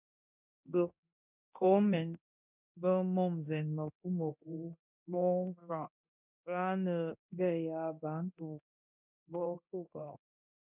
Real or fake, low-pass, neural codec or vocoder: fake; 3.6 kHz; codec, 24 kHz, 0.9 kbps, DualCodec